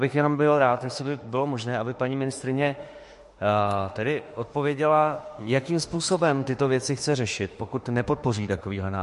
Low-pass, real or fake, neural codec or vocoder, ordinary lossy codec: 14.4 kHz; fake; autoencoder, 48 kHz, 32 numbers a frame, DAC-VAE, trained on Japanese speech; MP3, 48 kbps